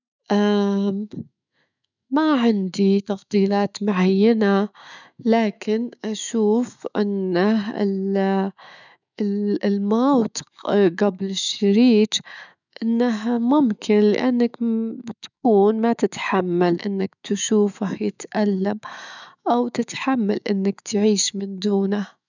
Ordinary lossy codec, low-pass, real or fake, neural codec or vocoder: none; 7.2 kHz; fake; autoencoder, 48 kHz, 128 numbers a frame, DAC-VAE, trained on Japanese speech